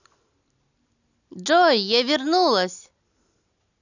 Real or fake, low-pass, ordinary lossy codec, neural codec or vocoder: real; 7.2 kHz; none; none